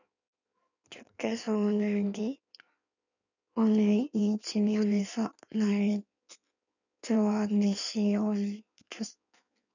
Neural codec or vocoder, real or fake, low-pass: codec, 16 kHz in and 24 kHz out, 1.1 kbps, FireRedTTS-2 codec; fake; 7.2 kHz